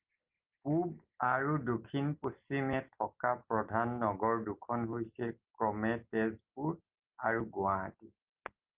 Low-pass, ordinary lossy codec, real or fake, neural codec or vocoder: 3.6 kHz; Opus, 32 kbps; real; none